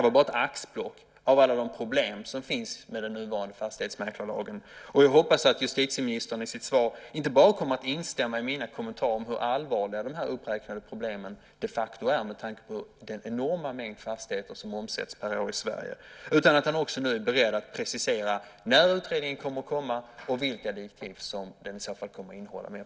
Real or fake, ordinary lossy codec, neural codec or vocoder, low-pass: real; none; none; none